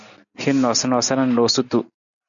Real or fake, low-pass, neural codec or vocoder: real; 7.2 kHz; none